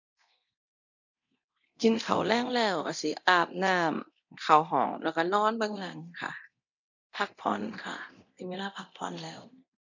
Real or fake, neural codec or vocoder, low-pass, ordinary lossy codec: fake; codec, 24 kHz, 0.9 kbps, DualCodec; 7.2 kHz; none